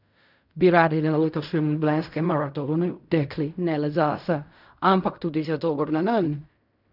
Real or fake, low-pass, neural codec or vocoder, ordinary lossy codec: fake; 5.4 kHz; codec, 16 kHz in and 24 kHz out, 0.4 kbps, LongCat-Audio-Codec, fine tuned four codebook decoder; none